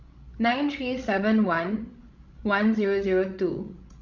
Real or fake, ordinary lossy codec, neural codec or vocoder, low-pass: fake; none; codec, 16 kHz, 16 kbps, FreqCodec, larger model; 7.2 kHz